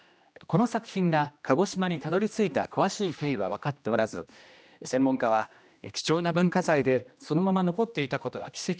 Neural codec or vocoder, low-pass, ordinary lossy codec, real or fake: codec, 16 kHz, 1 kbps, X-Codec, HuBERT features, trained on general audio; none; none; fake